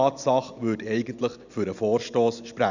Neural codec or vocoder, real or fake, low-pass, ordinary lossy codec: none; real; 7.2 kHz; none